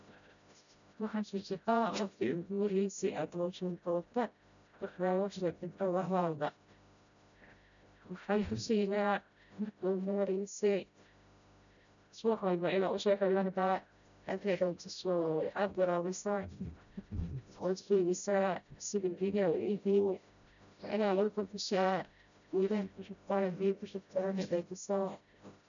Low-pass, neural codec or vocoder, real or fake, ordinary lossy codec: 7.2 kHz; codec, 16 kHz, 0.5 kbps, FreqCodec, smaller model; fake; none